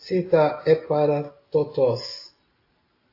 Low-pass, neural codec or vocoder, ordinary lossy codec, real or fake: 5.4 kHz; none; AAC, 32 kbps; real